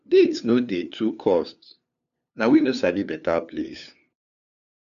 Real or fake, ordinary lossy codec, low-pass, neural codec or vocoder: fake; none; 7.2 kHz; codec, 16 kHz, 2 kbps, FunCodec, trained on LibriTTS, 25 frames a second